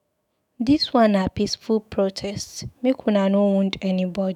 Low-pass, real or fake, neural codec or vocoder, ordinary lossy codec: 19.8 kHz; fake; autoencoder, 48 kHz, 128 numbers a frame, DAC-VAE, trained on Japanese speech; none